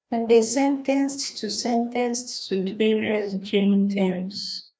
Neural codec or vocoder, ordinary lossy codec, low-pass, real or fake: codec, 16 kHz, 1 kbps, FreqCodec, larger model; none; none; fake